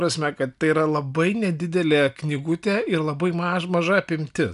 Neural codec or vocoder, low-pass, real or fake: none; 10.8 kHz; real